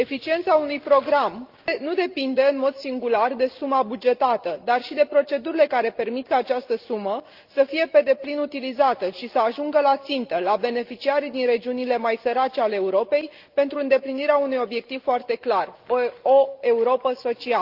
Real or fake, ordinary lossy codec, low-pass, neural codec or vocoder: real; Opus, 32 kbps; 5.4 kHz; none